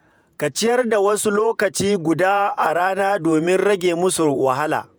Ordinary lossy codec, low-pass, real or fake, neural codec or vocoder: none; none; fake; vocoder, 48 kHz, 128 mel bands, Vocos